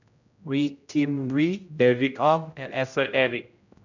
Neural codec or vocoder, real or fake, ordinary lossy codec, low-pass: codec, 16 kHz, 0.5 kbps, X-Codec, HuBERT features, trained on general audio; fake; none; 7.2 kHz